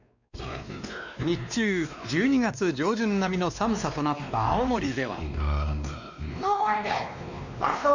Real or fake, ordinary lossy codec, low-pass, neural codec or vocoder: fake; none; 7.2 kHz; codec, 16 kHz, 2 kbps, X-Codec, WavLM features, trained on Multilingual LibriSpeech